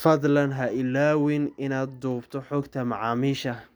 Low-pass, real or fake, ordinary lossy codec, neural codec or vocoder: none; real; none; none